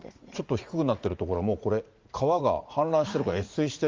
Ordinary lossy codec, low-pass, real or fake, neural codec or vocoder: Opus, 32 kbps; 7.2 kHz; fake; vocoder, 44.1 kHz, 80 mel bands, Vocos